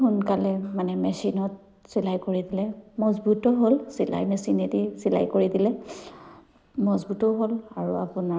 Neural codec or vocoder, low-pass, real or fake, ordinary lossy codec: none; none; real; none